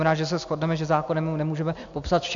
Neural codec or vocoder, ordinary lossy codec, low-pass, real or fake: none; AAC, 64 kbps; 7.2 kHz; real